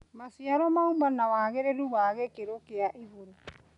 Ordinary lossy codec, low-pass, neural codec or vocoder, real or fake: none; 10.8 kHz; none; real